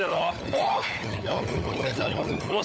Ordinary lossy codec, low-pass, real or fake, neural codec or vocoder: none; none; fake; codec, 16 kHz, 16 kbps, FunCodec, trained on LibriTTS, 50 frames a second